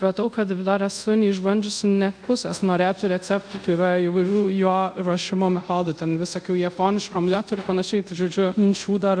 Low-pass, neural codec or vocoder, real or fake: 9.9 kHz; codec, 24 kHz, 0.5 kbps, DualCodec; fake